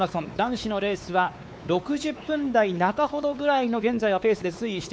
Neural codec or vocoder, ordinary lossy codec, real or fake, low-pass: codec, 16 kHz, 4 kbps, X-Codec, WavLM features, trained on Multilingual LibriSpeech; none; fake; none